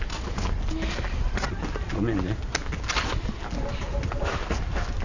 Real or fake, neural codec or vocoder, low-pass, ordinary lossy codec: real; none; 7.2 kHz; none